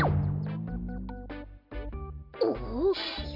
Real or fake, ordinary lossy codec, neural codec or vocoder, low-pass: real; none; none; 5.4 kHz